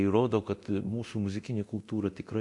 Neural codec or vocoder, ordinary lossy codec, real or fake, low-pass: codec, 24 kHz, 0.9 kbps, DualCodec; MP3, 64 kbps; fake; 10.8 kHz